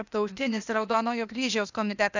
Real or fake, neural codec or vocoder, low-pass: fake; codec, 16 kHz, 0.8 kbps, ZipCodec; 7.2 kHz